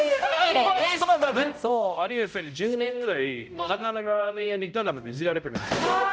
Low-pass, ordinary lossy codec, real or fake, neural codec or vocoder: none; none; fake; codec, 16 kHz, 0.5 kbps, X-Codec, HuBERT features, trained on balanced general audio